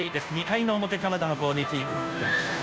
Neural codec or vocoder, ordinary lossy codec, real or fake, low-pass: codec, 16 kHz, 0.5 kbps, FunCodec, trained on Chinese and English, 25 frames a second; none; fake; none